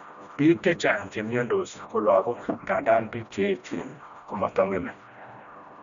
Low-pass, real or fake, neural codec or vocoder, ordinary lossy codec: 7.2 kHz; fake; codec, 16 kHz, 1 kbps, FreqCodec, smaller model; none